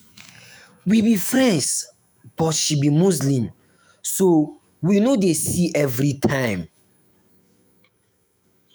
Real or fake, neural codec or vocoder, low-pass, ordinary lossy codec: fake; autoencoder, 48 kHz, 128 numbers a frame, DAC-VAE, trained on Japanese speech; none; none